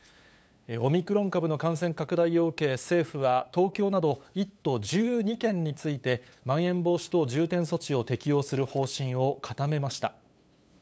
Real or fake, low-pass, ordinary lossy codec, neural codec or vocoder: fake; none; none; codec, 16 kHz, 8 kbps, FunCodec, trained on LibriTTS, 25 frames a second